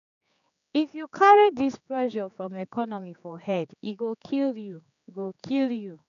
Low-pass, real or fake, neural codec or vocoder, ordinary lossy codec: 7.2 kHz; fake; codec, 16 kHz, 4 kbps, X-Codec, HuBERT features, trained on general audio; none